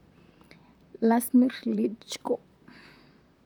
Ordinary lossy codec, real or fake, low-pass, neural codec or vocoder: none; fake; 19.8 kHz; vocoder, 44.1 kHz, 128 mel bands, Pupu-Vocoder